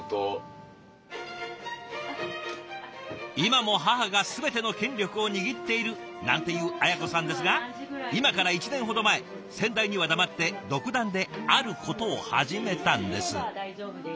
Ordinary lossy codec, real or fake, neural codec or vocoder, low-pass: none; real; none; none